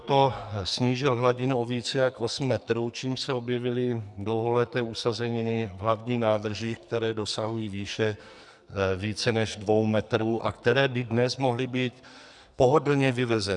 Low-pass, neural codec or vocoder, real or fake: 10.8 kHz; codec, 44.1 kHz, 2.6 kbps, SNAC; fake